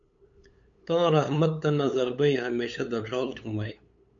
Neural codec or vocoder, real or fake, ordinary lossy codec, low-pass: codec, 16 kHz, 8 kbps, FunCodec, trained on LibriTTS, 25 frames a second; fake; MP3, 48 kbps; 7.2 kHz